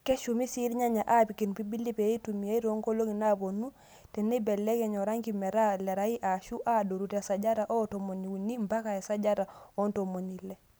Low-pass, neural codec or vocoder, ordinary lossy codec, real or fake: none; none; none; real